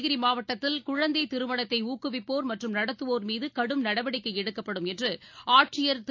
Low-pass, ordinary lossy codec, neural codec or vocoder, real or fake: 7.2 kHz; AAC, 48 kbps; none; real